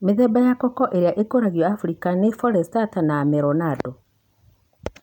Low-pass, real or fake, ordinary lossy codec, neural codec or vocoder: 19.8 kHz; real; none; none